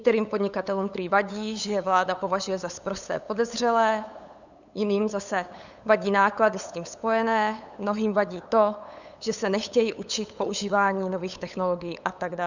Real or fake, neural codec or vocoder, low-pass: fake; codec, 16 kHz, 8 kbps, FunCodec, trained on LibriTTS, 25 frames a second; 7.2 kHz